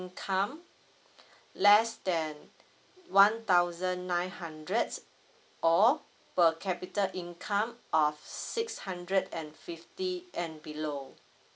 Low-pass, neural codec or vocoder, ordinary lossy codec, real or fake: none; none; none; real